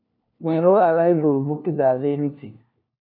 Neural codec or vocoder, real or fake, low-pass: codec, 16 kHz, 1 kbps, FunCodec, trained on LibriTTS, 50 frames a second; fake; 5.4 kHz